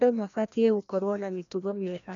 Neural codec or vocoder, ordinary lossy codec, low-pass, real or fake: codec, 16 kHz, 1 kbps, FreqCodec, larger model; none; 7.2 kHz; fake